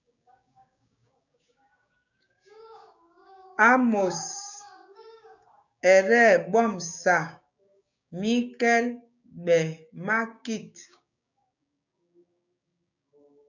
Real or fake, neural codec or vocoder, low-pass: fake; codec, 16 kHz, 6 kbps, DAC; 7.2 kHz